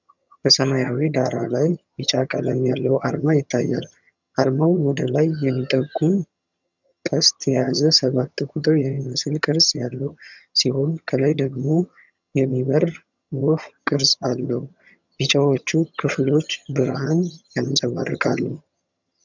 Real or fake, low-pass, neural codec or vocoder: fake; 7.2 kHz; vocoder, 22.05 kHz, 80 mel bands, HiFi-GAN